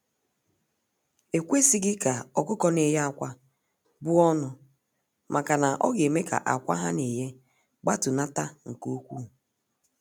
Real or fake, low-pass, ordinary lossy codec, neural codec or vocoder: real; none; none; none